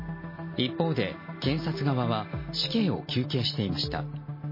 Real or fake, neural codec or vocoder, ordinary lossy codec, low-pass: real; none; MP3, 24 kbps; 5.4 kHz